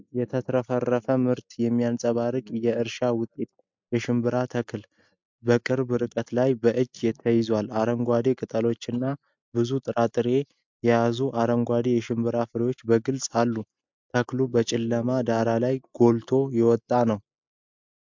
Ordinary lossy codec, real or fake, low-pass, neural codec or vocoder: MP3, 64 kbps; real; 7.2 kHz; none